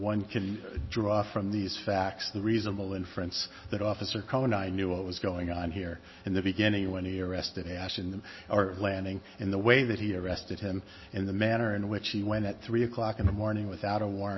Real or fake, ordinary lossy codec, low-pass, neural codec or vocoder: real; MP3, 24 kbps; 7.2 kHz; none